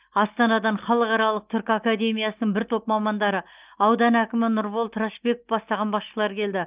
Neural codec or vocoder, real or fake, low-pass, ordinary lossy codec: none; real; 3.6 kHz; Opus, 32 kbps